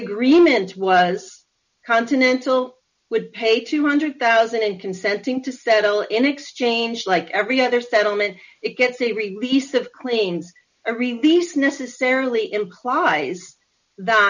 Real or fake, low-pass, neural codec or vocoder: real; 7.2 kHz; none